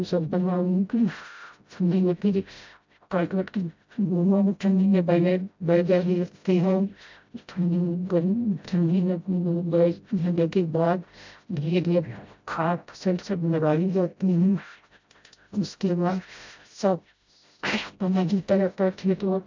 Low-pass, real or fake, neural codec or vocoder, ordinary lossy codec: 7.2 kHz; fake; codec, 16 kHz, 0.5 kbps, FreqCodec, smaller model; MP3, 64 kbps